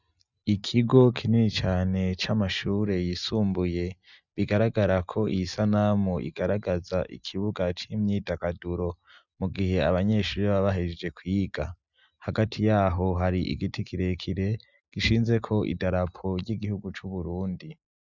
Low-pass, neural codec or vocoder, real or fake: 7.2 kHz; none; real